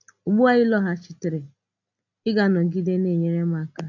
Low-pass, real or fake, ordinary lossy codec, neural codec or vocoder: 7.2 kHz; real; none; none